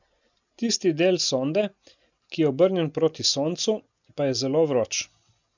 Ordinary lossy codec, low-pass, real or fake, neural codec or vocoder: none; 7.2 kHz; real; none